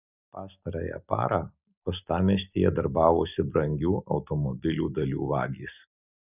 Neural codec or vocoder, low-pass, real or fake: none; 3.6 kHz; real